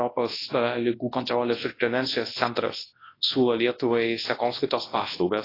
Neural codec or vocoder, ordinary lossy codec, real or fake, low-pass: codec, 24 kHz, 0.9 kbps, WavTokenizer, large speech release; AAC, 24 kbps; fake; 5.4 kHz